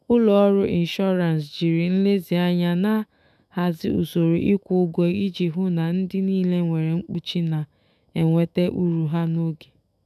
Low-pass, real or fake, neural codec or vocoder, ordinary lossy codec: 14.4 kHz; fake; autoencoder, 48 kHz, 128 numbers a frame, DAC-VAE, trained on Japanese speech; none